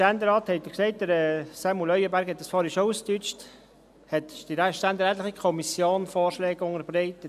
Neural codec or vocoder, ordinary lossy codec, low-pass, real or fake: none; none; 14.4 kHz; real